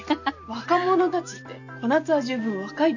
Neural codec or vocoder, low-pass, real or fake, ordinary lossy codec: none; 7.2 kHz; real; none